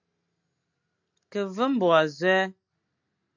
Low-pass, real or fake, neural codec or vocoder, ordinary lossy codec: 7.2 kHz; real; none; MP3, 64 kbps